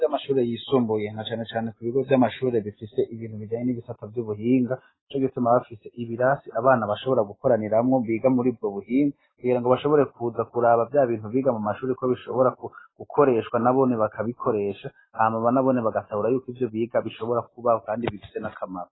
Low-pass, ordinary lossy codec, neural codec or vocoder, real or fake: 7.2 kHz; AAC, 16 kbps; none; real